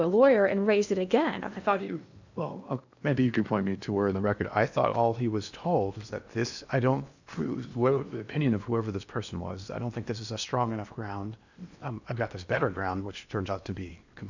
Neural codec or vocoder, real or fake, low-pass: codec, 16 kHz in and 24 kHz out, 0.8 kbps, FocalCodec, streaming, 65536 codes; fake; 7.2 kHz